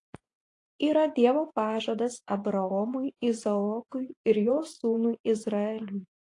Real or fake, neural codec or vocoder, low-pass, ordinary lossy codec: fake; vocoder, 24 kHz, 100 mel bands, Vocos; 10.8 kHz; AAC, 48 kbps